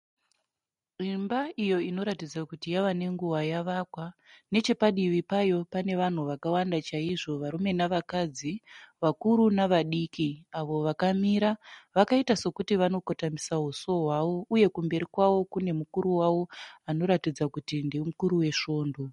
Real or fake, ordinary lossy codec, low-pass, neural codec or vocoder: real; MP3, 48 kbps; 19.8 kHz; none